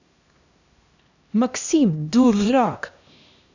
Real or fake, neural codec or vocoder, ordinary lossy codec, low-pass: fake; codec, 16 kHz, 1 kbps, X-Codec, HuBERT features, trained on LibriSpeech; none; 7.2 kHz